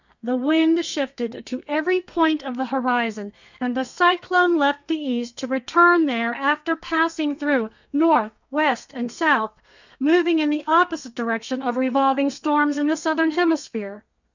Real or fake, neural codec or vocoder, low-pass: fake; codec, 32 kHz, 1.9 kbps, SNAC; 7.2 kHz